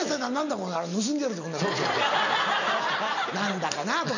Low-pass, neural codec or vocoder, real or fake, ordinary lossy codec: 7.2 kHz; none; real; none